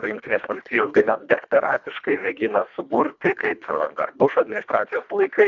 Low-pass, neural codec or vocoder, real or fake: 7.2 kHz; codec, 24 kHz, 1.5 kbps, HILCodec; fake